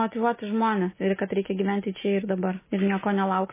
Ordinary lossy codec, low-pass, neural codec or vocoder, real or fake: MP3, 16 kbps; 3.6 kHz; none; real